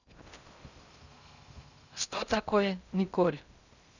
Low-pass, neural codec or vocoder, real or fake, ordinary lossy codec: 7.2 kHz; codec, 16 kHz in and 24 kHz out, 0.6 kbps, FocalCodec, streaming, 2048 codes; fake; none